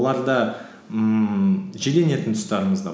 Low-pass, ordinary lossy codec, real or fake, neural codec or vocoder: none; none; real; none